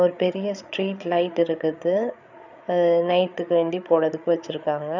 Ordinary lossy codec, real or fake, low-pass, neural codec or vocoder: none; fake; 7.2 kHz; codec, 16 kHz, 8 kbps, FreqCodec, larger model